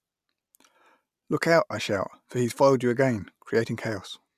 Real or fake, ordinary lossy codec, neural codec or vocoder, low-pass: real; none; none; 14.4 kHz